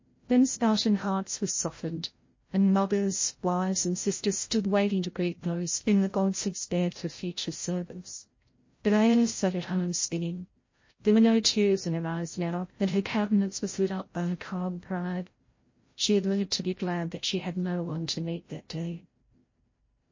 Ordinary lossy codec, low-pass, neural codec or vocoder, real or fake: MP3, 32 kbps; 7.2 kHz; codec, 16 kHz, 0.5 kbps, FreqCodec, larger model; fake